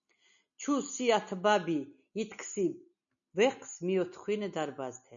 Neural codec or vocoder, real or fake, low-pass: none; real; 7.2 kHz